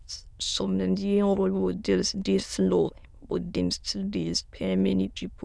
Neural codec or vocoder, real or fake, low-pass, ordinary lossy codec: autoencoder, 22.05 kHz, a latent of 192 numbers a frame, VITS, trained on many speakers; fake; none; none